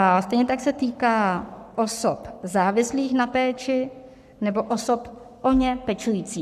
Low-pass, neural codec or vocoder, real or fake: 14.4 kHz; codec, 44.1 kHz, 7.8 kbps, Pupu-Codec; fake